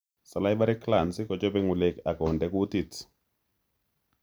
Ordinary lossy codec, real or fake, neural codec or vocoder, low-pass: none; real; none; none